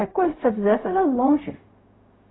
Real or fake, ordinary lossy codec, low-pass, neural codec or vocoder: fake; AAC, 16 kbps; 7.2 kHz; codec, 16 kHz, 0.4 kbps, LongCat-Audio-Codec